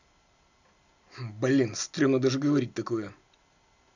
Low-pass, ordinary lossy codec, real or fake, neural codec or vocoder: 7.2 kHz; none; real; none